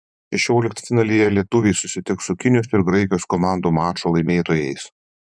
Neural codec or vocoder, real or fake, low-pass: vocoder, 24 kHz, 100 mel bands, Vocos; fake; 9.9 kHz